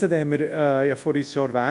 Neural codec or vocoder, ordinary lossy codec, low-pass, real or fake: codec, 24 kHz, 0.9 kbps, WavTokenizer, large speech release; AAC, 64 kbps; 10.8 kHz; fake